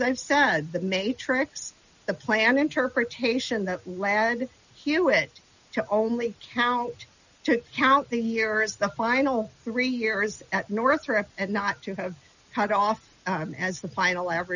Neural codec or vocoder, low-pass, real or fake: none; 7.2 kHz; real